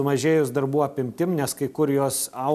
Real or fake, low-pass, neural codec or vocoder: real; 14.4 kHz; none